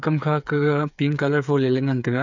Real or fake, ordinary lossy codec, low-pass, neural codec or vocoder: fake; none; 7.2 kHz; codec, 16 kHz, 8 kbps, FreqCodec, smaller model